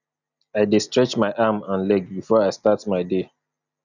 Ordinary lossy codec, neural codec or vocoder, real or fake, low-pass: none; none; real; 7.2 kHz